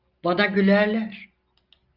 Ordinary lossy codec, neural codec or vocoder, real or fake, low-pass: Opus, 32 kbps; none; real; 5.4 kHz